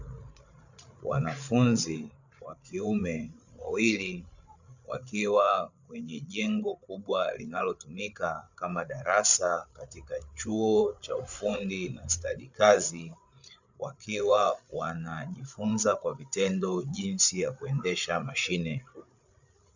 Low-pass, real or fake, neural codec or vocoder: 7.2 kHz; fake; codec, 16 kHz, 8 kbps, FreqCodec, larger model